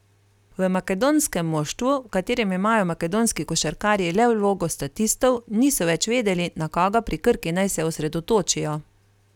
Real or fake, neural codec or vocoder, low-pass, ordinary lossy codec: real; none; 19.8 kHz; none